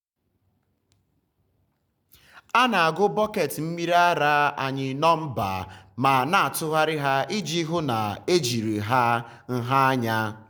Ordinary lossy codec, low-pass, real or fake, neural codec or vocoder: none; none; real; none